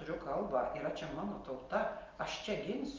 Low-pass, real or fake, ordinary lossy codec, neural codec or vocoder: 7.2 kHz; real; Opus, 24 kbps; none